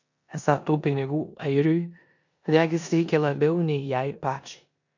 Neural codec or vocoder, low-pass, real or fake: codec, 16 kHz in and 24 kHz out, 0.9 kbps, LongCat-Audio-Codec, four codebook decoder; 7.2 kHz; fake